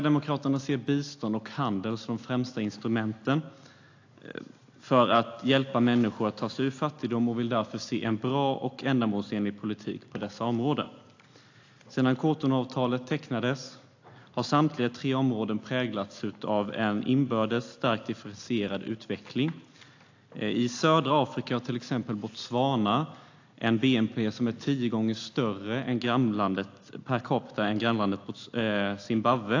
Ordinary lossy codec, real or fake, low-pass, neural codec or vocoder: AAC, 48 kbps; real; 7.2 kHz; none